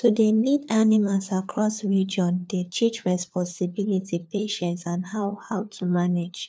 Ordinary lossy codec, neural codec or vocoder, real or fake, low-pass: none; codec, 16 kHz, 4 kbps, FunCodec, trained on LibriTTS, 50 frames a second; fake; none